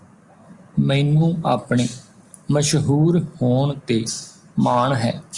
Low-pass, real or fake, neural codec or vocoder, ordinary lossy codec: 10.8 kHz; real; none; Opus, 64 kbps